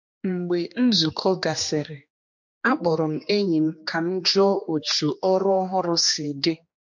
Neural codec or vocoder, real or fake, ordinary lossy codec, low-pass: codec, 16 kHz, 2 kbps, X-Codec, HuBERT features, trained on general audio; fake; MP3, 48 kbps; 7.2 kHz